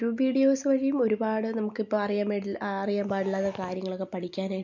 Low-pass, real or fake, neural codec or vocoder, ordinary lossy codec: 7.2 kHz; real; none; MP3, 48 kbps